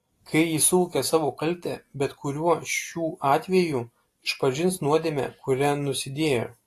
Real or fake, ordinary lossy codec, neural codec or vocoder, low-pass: real; AAC, 48 kbps; none; 14.4 kHz